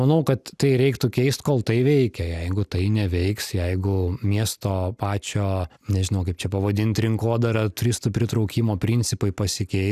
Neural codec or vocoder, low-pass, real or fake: none; 14.4 kHz; real